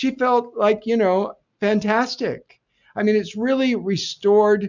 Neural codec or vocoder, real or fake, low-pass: none; real; 7.2 kHz